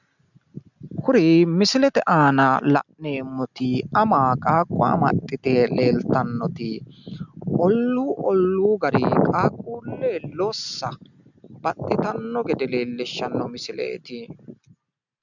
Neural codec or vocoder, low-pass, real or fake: none; 7.2 kHz; real